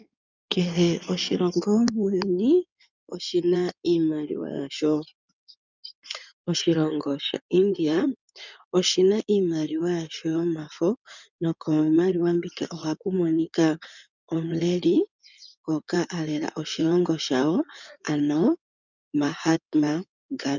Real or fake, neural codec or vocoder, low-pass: fake; codec, 16 kHz in and 24 kHz out, 2.2 kbps, FireRedTTS-2 codec; 7.2 kHz